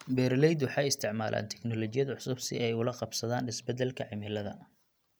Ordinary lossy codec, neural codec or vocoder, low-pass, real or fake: none; none; none; real